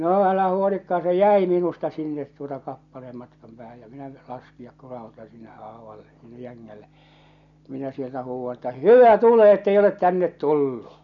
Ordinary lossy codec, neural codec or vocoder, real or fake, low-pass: none; none; real; 7.2 kHz